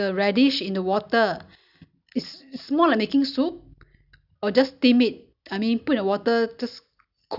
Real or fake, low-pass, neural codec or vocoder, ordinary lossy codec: real; 5.4 kHz; none; none